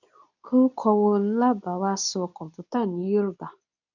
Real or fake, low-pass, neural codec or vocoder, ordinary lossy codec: fake; 7.2 kHz; codec, 24 kHz, 0.9 kbps, WavTokenizer, medium speech release version 2; none